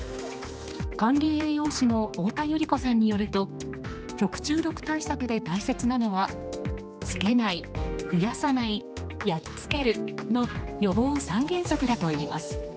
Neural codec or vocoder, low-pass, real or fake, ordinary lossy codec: codec, 16 kHz, 2 kbps, X-Codec, HuBERT features, trained on general audio; none; fake; none